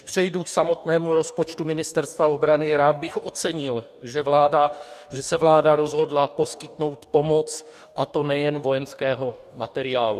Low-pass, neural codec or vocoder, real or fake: 14.4 kHz; codec, 44.1 kHz, 2.6 kbps, DAC; fake